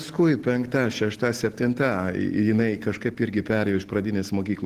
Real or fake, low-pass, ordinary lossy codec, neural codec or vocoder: real; 14.4 kHz; Opus, 16 kbps; none